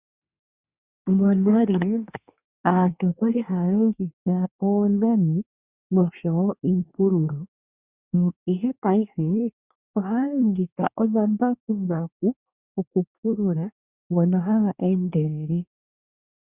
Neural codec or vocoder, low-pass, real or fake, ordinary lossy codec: codec, 24 kHz, 1 kbps, SNAC; 3.6 kHz; fake; Opus, 64 kbps